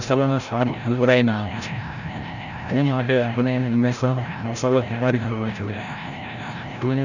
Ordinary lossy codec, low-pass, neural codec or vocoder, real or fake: none; 7.2 kHz; codec, 16 kHz, 0.5 kbps, FreqCodec, larger model; fake